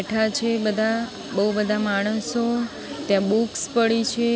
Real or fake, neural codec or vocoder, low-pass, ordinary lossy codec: real; none; none; none